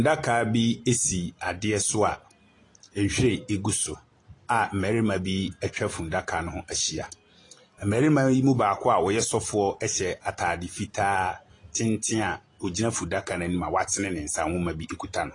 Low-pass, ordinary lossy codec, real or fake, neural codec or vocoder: 10.8 kHz; AAC, 32 kbps; real; none